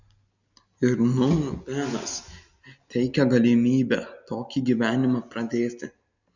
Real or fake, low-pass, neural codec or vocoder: real; 7.2 kHz; none